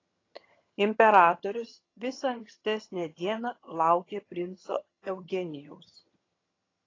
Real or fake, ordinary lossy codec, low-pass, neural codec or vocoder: fake; AAC, 32 kbps; 7.2 kHz; vocoder, 22.05 kHz, 80 mel bands, HiFi-GAN